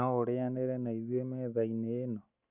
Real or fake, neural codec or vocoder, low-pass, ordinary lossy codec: real; none; 3.6 kHz; none